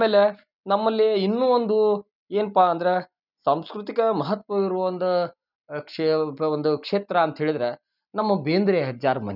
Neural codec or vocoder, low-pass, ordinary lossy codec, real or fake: none; 5.4 kHz; none; real